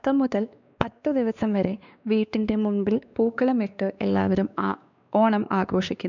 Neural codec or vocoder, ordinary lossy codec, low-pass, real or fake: codec, 16 kHz, 2 kbps, X-Codec, WavLM features, trained on Multilingual LibriSpeech; none; 7.2 kHz; fake